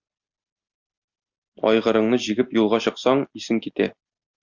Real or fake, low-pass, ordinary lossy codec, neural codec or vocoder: real; 7.2 kHz; Opus, 64 kbps; none